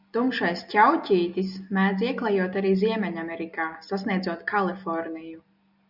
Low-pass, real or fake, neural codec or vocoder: 5.4 kHz; real; none